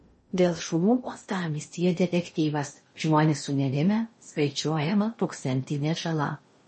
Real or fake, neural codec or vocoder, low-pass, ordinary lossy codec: fake; codec, 16 kHz in and 24 kHz out, 0.6 kbps, FocalCodec, streaming, 2048 codes; 10.8 kHz; MP3, 32 kbps